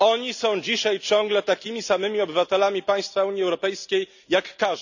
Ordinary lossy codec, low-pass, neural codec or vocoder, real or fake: none; 7.2 kHz; none; real